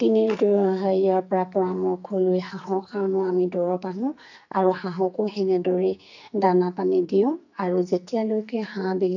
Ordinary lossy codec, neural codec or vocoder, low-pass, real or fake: none; codec, 44.1 kHz, 2.6 kbps, SNAC; 7.2 kHz; fake